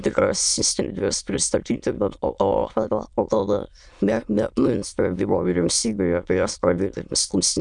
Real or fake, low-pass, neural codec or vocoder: fake; 9.9 kHz; autoencoder, 22.05 kHz, a latent of 192 numbers a frame, VITS, trained on many speakers